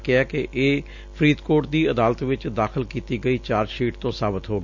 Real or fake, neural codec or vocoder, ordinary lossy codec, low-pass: real; none; none; 7.2 kHz